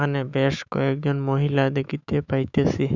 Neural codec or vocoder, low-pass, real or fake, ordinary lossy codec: autoencoder, 48 kHz, 128 numbers a frame, DAC-VAE, trained on Japanese speech; 7.2 kHz; fake; none